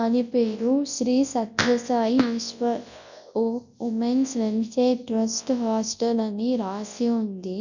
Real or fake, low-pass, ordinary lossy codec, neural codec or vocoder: fake; 7.2 kHz; none; codec, 24 kHz, 0.9 kbps, WavTokenizer, large speech release